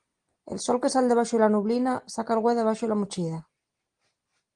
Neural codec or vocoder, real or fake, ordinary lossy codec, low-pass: none; real; Opus, 24 kbps; 9.9 kHz